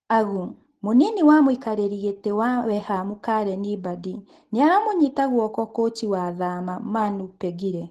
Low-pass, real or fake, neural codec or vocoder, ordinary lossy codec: 14.4 kHz; real; none; Opus, 16 kbps